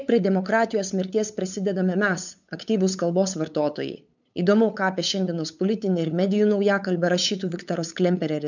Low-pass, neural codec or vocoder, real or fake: 7.2 kHz; codec, 16 kHz, 8 kbps, FunCodec, trained on LibriTTS, 25 frames a second; fake